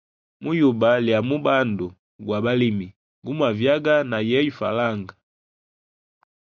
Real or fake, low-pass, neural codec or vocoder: real; 7.2 kHz; none